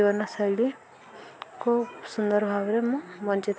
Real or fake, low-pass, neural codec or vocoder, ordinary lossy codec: real; none; none; none